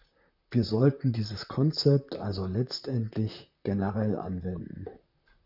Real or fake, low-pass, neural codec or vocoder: fake; 5.4 kHz; vocoder, 44.1 kHz, 128 mel bands, Pupu-Vocoder